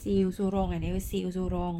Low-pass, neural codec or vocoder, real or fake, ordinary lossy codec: 19.8 kHz; vocoder, 44.1 kHz, 128 mel bands, Pupu-Vocoder; fake; none